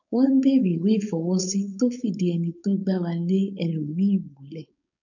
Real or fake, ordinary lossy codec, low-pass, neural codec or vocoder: fake; none; 7.2 kHz; codec, 16 kHz, 4.8 kbps, FACodec